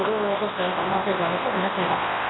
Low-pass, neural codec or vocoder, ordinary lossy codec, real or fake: 7.2 kHz; codec, 24 kHz, 0.9 kbps, WavTokenizer, large speech release; AAC, 16 kbps; fake